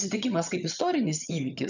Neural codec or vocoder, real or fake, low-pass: vocoder, 22.05 kHz, 80 mel bands, HiFi-GAN; fake; 7.2 kHz